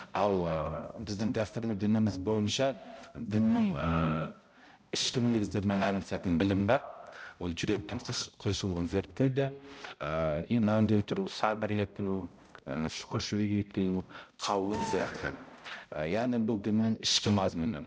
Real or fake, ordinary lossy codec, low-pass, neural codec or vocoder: fake; none; none; codec, 16 kHz, 0.5 kbps, X-Codec, HuBERT features, trained on balanced general audio